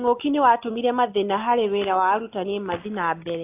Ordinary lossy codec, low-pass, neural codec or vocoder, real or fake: AAC, 24 kbps; 3.6 kHz; none; real